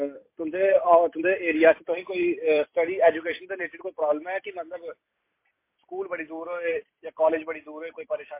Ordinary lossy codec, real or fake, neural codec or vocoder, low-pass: MP3, 24 kbps; fake; vocoder, 44.1 kHz, 128 mel bands every 256 samples, BigVGAN v2; 3.6 kHz